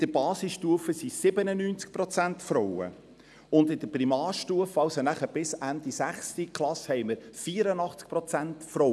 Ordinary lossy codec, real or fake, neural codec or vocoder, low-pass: none; real; none; none